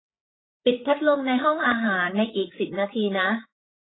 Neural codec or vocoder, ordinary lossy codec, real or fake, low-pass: codec, 16 kHz, 8 kbps, FreqCodec, larger model; AAC, 16 kbps; fake; 7.2 kHz